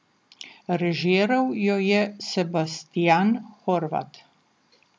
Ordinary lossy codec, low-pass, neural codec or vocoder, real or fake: none; none; none; real